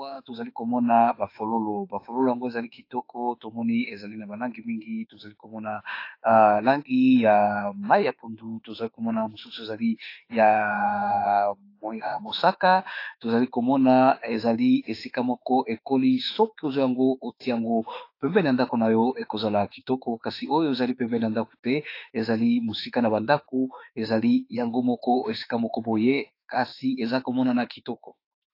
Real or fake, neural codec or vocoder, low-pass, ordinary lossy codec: fake; autoencoder, 48 kHz, 32 numbers a frame, DAC-VAE, trained on Japanese speech; 5.4 kHz; AAC, 32 kbps